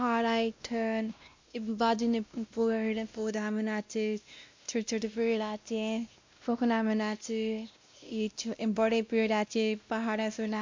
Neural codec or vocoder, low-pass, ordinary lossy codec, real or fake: codec, 16 kHz, 1 kbps, X-Codec, WavLM features, trained on Multilingual LibriSpeech; 7.2 kHz; MP3, 64 kbps; fake